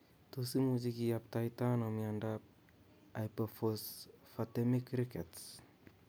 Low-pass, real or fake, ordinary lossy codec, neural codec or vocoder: none; real; none; none